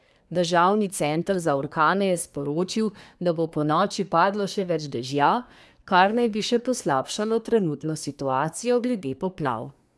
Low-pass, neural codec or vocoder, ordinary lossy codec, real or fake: none; codec, 24 kHz, 1 kbps, SNAC; none; fake